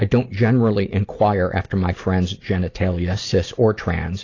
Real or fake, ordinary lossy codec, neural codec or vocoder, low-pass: real; AAC, 32 kbps; none; 7.2 kHz